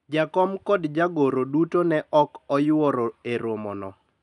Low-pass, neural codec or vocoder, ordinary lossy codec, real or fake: 10.8 kHz; none; none; real